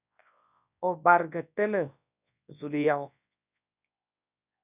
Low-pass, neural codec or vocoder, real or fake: 3.6 kHz; codec, 24 kHz, 0.9 kbps, WavTokenizer, large speech release; fake